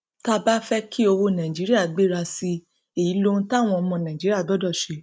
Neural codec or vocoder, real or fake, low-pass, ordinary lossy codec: none; real; none; none